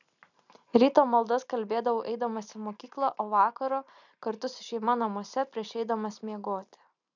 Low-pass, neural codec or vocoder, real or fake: 7.2 kHz; none; real